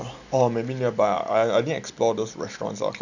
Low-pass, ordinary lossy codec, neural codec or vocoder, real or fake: 7.2 kHz; none; none; real